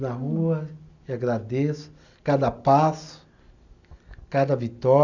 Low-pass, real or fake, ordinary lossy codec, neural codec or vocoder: 7.2 kHz; real; none; none